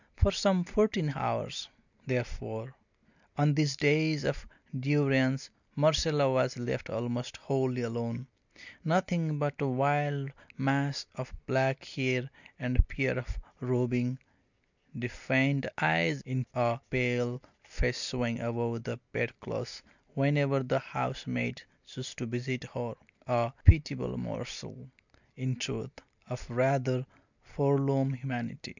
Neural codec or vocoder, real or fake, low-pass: none; real; 7.2 kHz